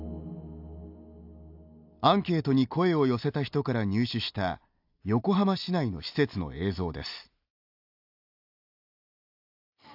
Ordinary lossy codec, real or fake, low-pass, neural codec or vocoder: AAC, 48 kbps; real; 5.4 kHz; none